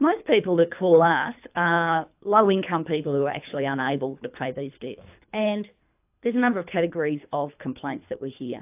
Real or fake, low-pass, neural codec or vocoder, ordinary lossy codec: fake; 3.6 kHz; codec, 24 kHz, 3 kbps, HILCodec; AAC, 32 kbps